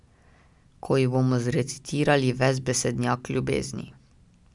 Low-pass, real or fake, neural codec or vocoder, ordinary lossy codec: 10.8 kHz; real; none; none